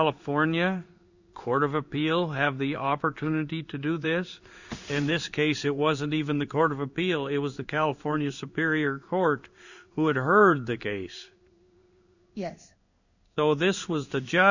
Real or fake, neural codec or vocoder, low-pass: fake; codec, 16 kHz in and 24 kHz out, 1 kbps, XY-Tokenizer; 7.2 kHz